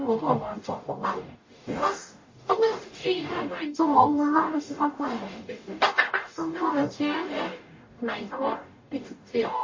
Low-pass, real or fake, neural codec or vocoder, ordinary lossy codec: 7.2 kHz; fake; codec, 44.1 kHz, 0.9 kbps, DAC; MP3, 32 kbps